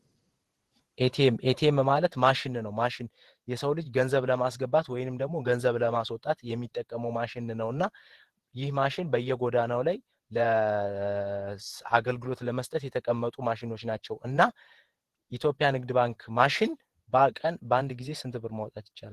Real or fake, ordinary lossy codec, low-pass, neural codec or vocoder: fake; Opus, 16 kbps; 14.4 kHz; vocoder, 48 kHz, 128 mel bands, Vocos